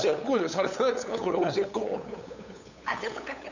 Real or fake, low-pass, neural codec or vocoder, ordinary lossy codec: fake; 7.2 kHz; codec, 16 kHz, 8 kbps, FunCodec, trained on LibriTTS, 25 frames a second; none